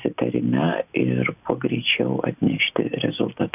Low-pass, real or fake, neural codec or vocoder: 3.6 kHz; real; none